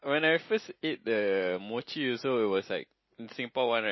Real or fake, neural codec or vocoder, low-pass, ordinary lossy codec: real; none; 7.2 kHz; MP3, 24 kbps